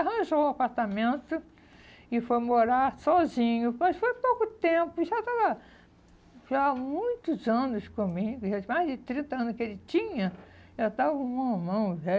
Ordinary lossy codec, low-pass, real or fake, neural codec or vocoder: none; none; real; none